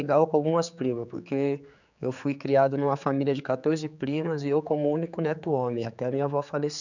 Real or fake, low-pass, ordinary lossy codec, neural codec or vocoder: fake; 7.2 kHz; none; codec, 16 kHz, 4 kbps, X-Codec, HuBERT features, trained on general audio